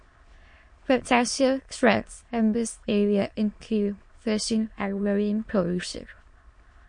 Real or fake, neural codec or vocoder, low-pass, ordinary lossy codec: fake; autoencoder, 22.05 kHz, a latent of 192 numbers a frame, VITS, trained on many speakers; 9.9 kHz; MP3, 48 kbps